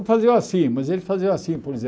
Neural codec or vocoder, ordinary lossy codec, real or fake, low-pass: none; none; real; none